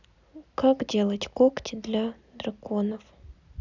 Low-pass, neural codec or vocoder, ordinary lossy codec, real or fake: 7.2 kHz; none; none; real